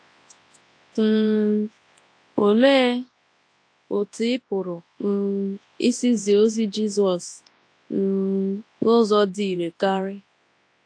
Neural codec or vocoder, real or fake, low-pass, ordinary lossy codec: codec, 24 kHz, 0.9 kbps, WavTokenizer, large speech release; fake; 9.9 kHz; AAC, 48 kbps